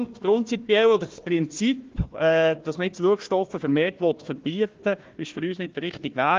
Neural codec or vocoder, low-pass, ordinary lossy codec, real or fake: codec, 16 kHz, 1 kbps, FunCodec, trained on Chinese and English, 50 frames a second; 7.2 kHz; Opus, 32 kbps; fake